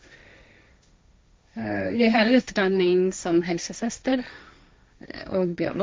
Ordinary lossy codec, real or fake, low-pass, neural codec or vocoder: none; fake; none; codec, 16 kHz, 1.1 kbps, Voila-Tokenizer